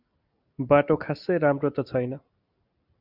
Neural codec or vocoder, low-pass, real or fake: none; 5.4 kHz; real